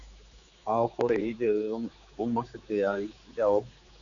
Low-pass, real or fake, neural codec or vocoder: 7.2 kHz; fake; codec, 16 kHz, 4 kbps, X-Codec, HuBERT features, trained on general audio